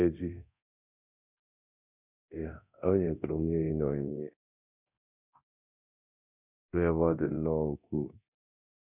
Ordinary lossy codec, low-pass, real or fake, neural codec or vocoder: none; 3.6 kHz; fake; codec, 24 kHz, 0.9 kbps, DualCodec